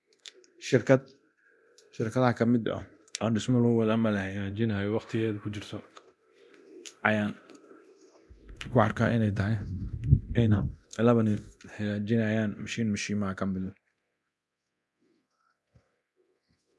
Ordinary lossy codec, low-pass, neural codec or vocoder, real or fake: none; none; codec, 24 kHz, 0.9 kbps, DualCodec; fake